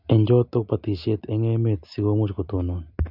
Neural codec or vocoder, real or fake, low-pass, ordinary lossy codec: none; real; 5.4 kHz; none